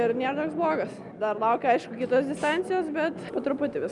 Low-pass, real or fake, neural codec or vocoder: 10.8 kHz; real; none